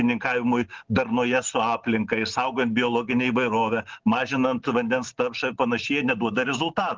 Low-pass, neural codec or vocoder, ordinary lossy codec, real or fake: 7.2 kHz; none; Opus, 16 kbps; real